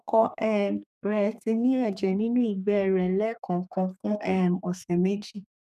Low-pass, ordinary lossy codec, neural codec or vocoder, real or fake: 14.4 kHz; none; codec, 32 kHz, 1.9 kbps, SNAC; fake